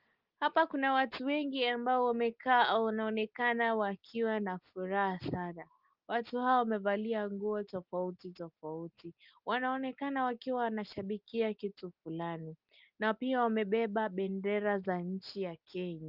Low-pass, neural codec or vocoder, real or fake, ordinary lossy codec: 5.4 kHz; none; real; Opus, 24 kbps